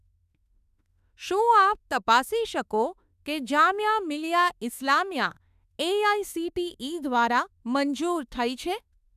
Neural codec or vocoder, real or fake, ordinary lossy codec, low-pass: autoencoder, 48 kHz, 32 numbers a frame, DAC-VAE, trained on Japanese speech; fake; none; 14.4 kHz